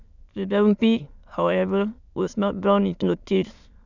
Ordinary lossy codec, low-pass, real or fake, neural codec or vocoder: none; 7.2 kHz; fake; autoencoder, 22.05 kHz, a latent of 192 numbers a frame, VITS, trained on many speakers